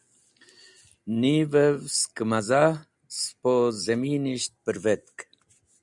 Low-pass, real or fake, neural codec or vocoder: 10.8 kHz; real; none